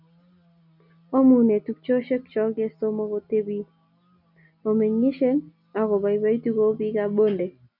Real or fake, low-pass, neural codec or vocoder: real; 5.4 kHz; none